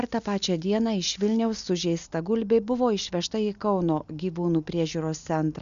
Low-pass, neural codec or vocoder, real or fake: 7.2 kHz; none; real